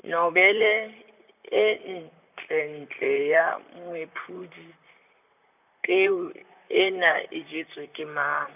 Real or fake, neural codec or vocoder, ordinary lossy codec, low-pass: fake; vocoder, 44.1 kHz, 128 mel bands, Pupu-Vocoder; none; 3.6 kHz